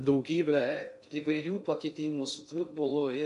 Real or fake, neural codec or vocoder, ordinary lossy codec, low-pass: fake; codec, 16 kHz in and 24 kHz out, 0.6 kbps, FocalCodec, streaming, 2048 codes; MP3, 64 kbps; 10.8 kHz